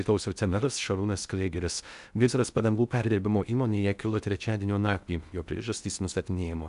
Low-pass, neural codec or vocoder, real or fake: 10.8 kHz; codec, 16 kHz in and 24 kHz out, 0.6 kbps, FocalCodec, streaming, 2048 codes; fake